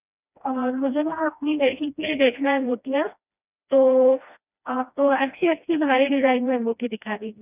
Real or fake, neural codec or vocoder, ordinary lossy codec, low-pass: fake; codec, 16 kHz, 1 kbps, FreqCodec, smaller model; none; 3.6 kHz